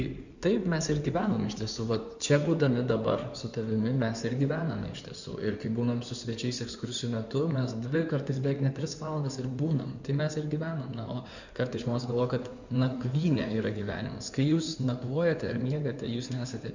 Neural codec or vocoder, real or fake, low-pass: codec, 16 kHz in and 24 kHz out, 2.2 kbps, FireRedTTS-2 codec; fake; 7.2 kHz